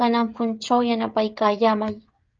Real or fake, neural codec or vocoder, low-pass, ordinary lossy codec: fake; codec, 16 kHz, 16 kbps, FreqCodec, smaller model; 7.2 kHz; Opus, 24 kbps